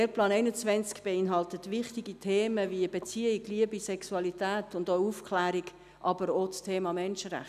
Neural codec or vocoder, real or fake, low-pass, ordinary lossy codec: none; real; 14.4 kHz; none